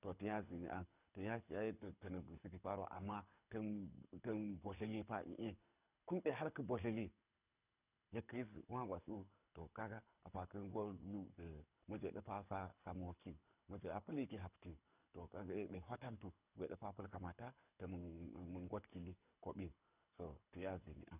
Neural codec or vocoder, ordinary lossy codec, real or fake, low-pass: codec, 24 kHz, 6 kbps, HILCodec; none; fake; 3.6 kHz